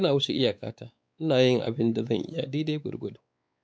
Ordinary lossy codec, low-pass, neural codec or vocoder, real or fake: none; none; codec, 16 kHz, 4 kbps, X-Codec, WavLM features, trained on Multilingual LibriSpeech; fake